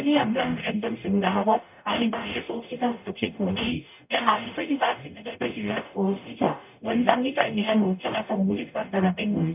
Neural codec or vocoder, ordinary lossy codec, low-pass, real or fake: codec, 44.1 kHz, 0.9 kbps, DAC; none; 3.6 kHz; fake